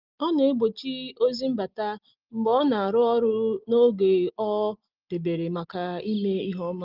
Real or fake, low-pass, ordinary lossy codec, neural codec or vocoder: real; 5.4 kHz; Opus, 32 kbps; none